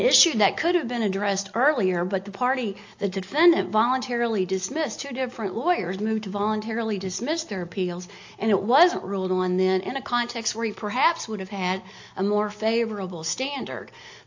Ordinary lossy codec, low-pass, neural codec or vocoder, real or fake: AAC, 48 kbps; 7.2 kHz; none; real